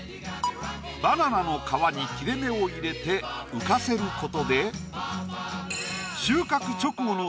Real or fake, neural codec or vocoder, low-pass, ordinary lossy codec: real; none; none; none